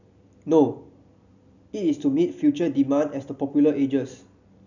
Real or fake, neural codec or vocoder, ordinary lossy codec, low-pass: real; none; none; 7.2 kHz